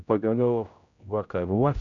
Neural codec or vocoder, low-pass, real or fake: codec, 16 kHz, 0.5 kbps, X-Codec, HuBERT features, trained on general audio; 7.2 kHz; fake